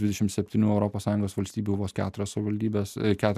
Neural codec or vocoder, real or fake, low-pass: none; real; 14.4 kHz